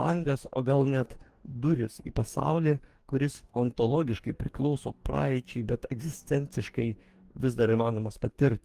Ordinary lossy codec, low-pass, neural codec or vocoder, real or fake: Opus, 24 kbps; 14.4 kHz; codec, 44.1 kHz, 2.6 kbps, DAC; fake